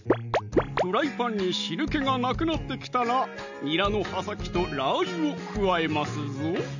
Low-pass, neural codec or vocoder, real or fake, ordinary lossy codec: 7.2 kHz; none; real; none